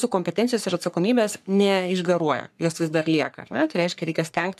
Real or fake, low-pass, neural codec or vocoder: fake; 14.4 kHz; codec, 44.1 kHz, 3.4 kbps, Pupu-Codec